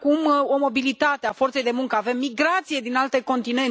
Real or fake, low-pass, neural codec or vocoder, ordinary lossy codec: real; none; none; none